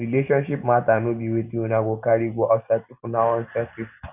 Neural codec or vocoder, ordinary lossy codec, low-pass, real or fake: none; none; 3.6 kHz; real